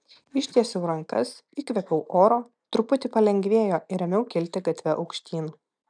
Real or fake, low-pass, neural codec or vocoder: real; 9.9 kHz; none